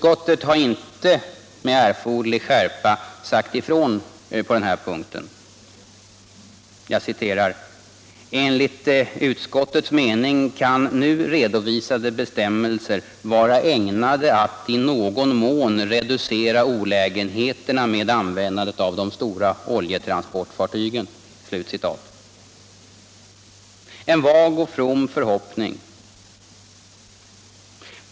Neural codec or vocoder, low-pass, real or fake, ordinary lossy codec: none; none; real; none